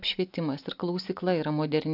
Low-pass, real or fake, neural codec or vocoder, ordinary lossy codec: 5.4 kHz; real; none; AAC, 48 kbps